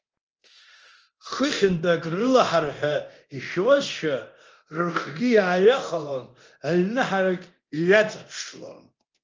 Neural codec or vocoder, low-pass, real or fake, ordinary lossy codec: codec, 24 kHz, 0.9 kbps, DualCodec; 7.2 kHz; fake; Opus, 24 kbps